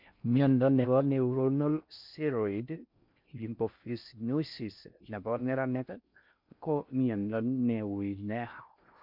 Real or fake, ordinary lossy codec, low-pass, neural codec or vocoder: fake; MP3, 48 kbps; 5.4 kHz; codec, 16 kHz in and 24 kHz out, 0.6 kbps, FocalCodec, streaming, 4096 codes